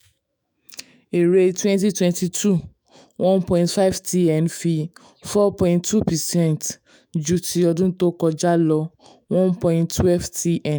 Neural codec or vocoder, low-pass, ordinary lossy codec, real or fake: autoencoder, 48 kHz, 128 numbers a frame, DAC-VAE, trained on Japanese speech; none; none; fake